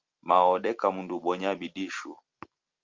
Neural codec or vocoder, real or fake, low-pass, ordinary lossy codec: none; real; 7.2 kHz; Opus, 24 kbps